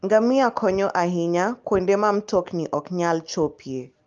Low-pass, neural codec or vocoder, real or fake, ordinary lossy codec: 7.2 kHz; none; real; Opus, 32 kbps